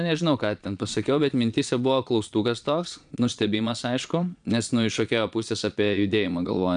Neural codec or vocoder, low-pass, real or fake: vocoder, 22.05 kHz, 80 mel bands, Vocos; 9.9 kHz; fake